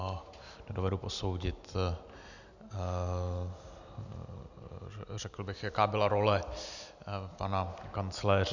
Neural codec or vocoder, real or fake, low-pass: none; real; 7.2 kHz